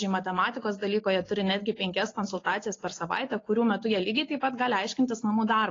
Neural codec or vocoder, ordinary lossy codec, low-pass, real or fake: none; AAC, 32 kbps; 7.2 kHz; real